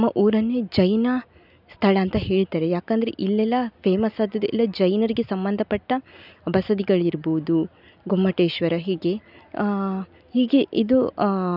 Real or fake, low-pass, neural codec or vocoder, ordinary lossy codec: real; 5.4 kHz; none; none